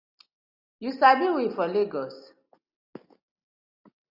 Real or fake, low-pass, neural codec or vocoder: real; 5.4 kHz; none